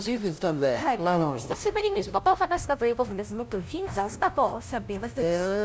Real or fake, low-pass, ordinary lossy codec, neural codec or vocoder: fake; none; none; codec, 16 kHz, 0.5 kbps, FunCodec, trained on LibriTTS, 25 frames a second